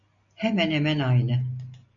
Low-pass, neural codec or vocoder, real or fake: 7.2 kHz; none; real